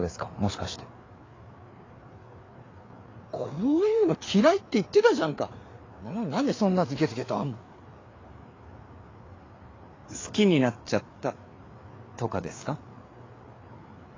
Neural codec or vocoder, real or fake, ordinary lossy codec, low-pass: codec, 16 kHz, 4 kbps, FreqCodec, larger model; fake; AAC, 32 kbps; 7.2 kHz